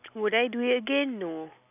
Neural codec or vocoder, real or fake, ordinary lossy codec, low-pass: none; real; none; 3.6 kHz